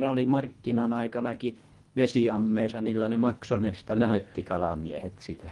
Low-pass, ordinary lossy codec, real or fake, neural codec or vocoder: 10.8 kHz; Opus, 24 kbps; fake; codec, 24 kHz, 1.5 kbps, HILCodec